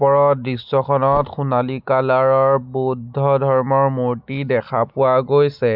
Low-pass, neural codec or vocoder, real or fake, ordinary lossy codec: 5.4 kHz; none; real; none